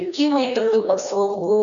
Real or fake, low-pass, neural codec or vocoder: fake; 7.2 kHz; codec, 16 kHz, 1 kbps, FreqCodec, larger model